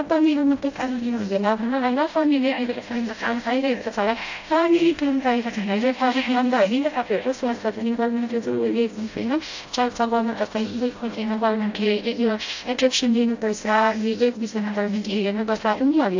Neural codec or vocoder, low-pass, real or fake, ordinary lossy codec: codec, 16 kHz, 0.5 kbps, FreqCodec, smaller model; 7.2 kHz; fake; AAC, 48 kbps